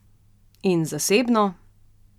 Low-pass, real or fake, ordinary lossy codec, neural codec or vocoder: 19.8 kHz; real; none; none